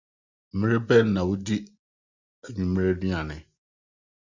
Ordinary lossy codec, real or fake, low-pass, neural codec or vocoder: Opus, 64 kbps; real; 7.2 kHz; none